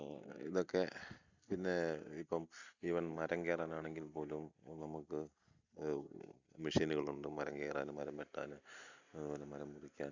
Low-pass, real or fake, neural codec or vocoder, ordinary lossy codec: 7.2 kHz; real; none; Opus, 64 kbps